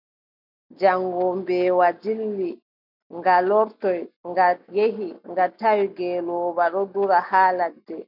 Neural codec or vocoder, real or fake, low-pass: none; real; 5.4 kHz